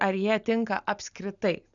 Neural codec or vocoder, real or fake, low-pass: none; real; 7.2 kHz